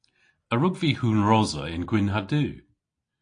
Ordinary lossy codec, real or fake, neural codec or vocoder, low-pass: AAC, 48 kbps; real; none; 9.9 kHz